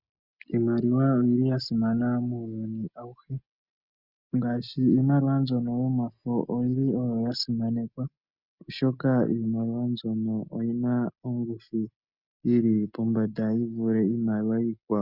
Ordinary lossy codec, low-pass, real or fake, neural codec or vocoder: Opus, 64 kbps; 5.4 kHz; real; none